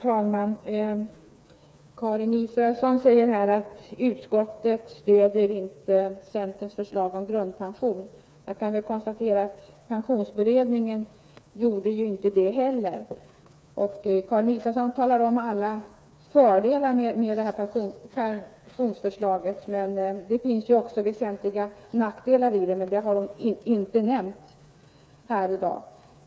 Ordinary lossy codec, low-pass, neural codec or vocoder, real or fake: none; none; codec, 16 kHz, 4 kbps, FreqCodec, smaller model; fake